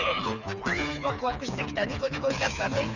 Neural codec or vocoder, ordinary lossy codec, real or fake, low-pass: codec, 16 kHz, 4 kbps, FreqCodec, smaller model; none; fake; 7.2 kHz